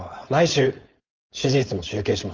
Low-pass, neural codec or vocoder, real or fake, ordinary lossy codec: 7.2 kHz; codec, 16 kHz, 4.8 kbps, FACodec; fake; Opus, 32 kbps